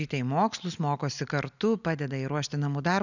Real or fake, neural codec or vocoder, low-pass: real; none; 7.2 kHz